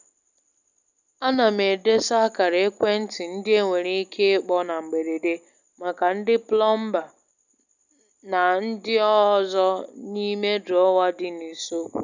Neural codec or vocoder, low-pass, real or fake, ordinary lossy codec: none; 7.2 kHz; real; none